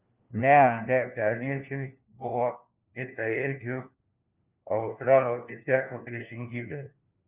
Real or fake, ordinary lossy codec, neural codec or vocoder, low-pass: fake; Opus, 24 kbps; codec, 16 kHz, 1 kbps, FunCodec, trained on LibriTTS, 50 frames a second; 3.6 kHz